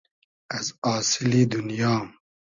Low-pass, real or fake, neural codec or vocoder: 7.2 kHz; real; none